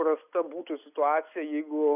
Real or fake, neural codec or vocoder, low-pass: real; none; 3.6 kHz